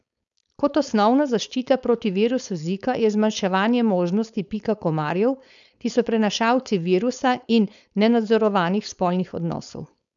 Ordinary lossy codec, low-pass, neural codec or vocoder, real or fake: none; 7.2 kHz; codec, 16 kHz, 4.8 kbps, FACodec; fake